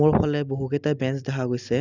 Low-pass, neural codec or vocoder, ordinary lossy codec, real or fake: 7.2 kHz; none; Opus, 64 kbps; real